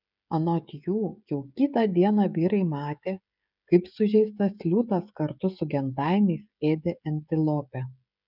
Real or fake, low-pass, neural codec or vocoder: fake; 5.4 kHz; codec, 16 kHz, 16 kbps, FreqCodec, smaller model